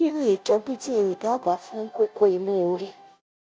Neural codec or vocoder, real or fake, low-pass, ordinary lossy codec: codec, 16 kHz, 0.5 kbps, FunCodec, trained on Chinese and English, 25 frames a second; fake; none; none